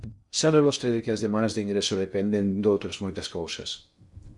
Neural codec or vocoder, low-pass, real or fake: codec, 16 kHz in and 24 kHz out, 0.6 kbps, FocalCodec, streaming, 4096 codes; 10.8 kHz; fake